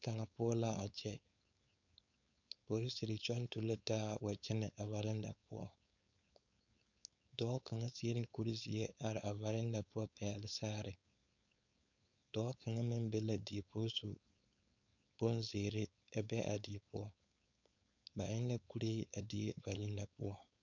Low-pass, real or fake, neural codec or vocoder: 7.2 kHz; fake; codec, 16 kHz, 4.8 kbps, FACodec